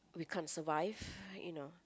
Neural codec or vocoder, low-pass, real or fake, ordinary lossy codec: none; none; real; none